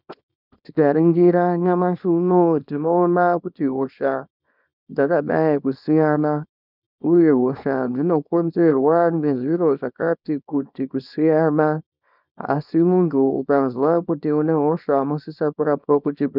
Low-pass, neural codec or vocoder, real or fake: 5.4 kHz; codec, 24 kHz, 0.9 kbps, WavTokenizer, small release; fake